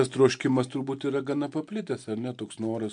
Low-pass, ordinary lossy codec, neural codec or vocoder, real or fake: 9.9 kHz; MP3, 64 kbps; none; real